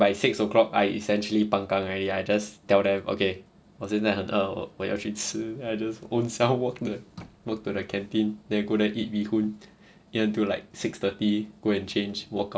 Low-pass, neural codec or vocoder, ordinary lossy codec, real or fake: none; none; none; real